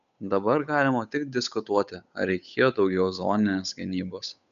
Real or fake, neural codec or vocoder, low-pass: fake; codec, 16 kHz, 8 kbps, FunCodec, trained on Chinese and English, 25 frames a second; 7.2 kHz